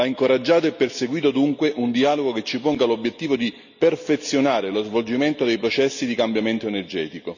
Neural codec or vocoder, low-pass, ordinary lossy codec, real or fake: none; 7.2 kHz; none; real